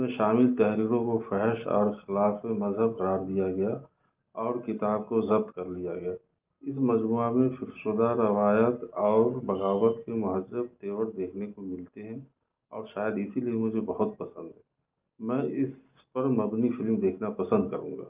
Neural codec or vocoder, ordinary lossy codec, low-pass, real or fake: none; Opus, 24 kbps; 3.6 kHz; real